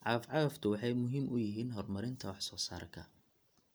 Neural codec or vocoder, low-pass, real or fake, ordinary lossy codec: none; none; real; none